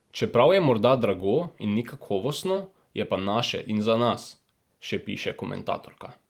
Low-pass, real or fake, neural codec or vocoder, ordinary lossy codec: 19.8 kHz; fake; vocoder, 44.1 kHz, 128 mel bands every 512 samples, BigVGAN v2; Opus, 24 kbps